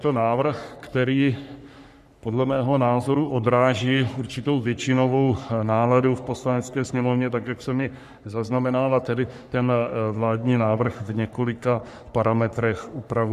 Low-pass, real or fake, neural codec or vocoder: 14.4 kHz; fake; codec, 44.1 kHz, 3.4 kbps, Pupu-Codec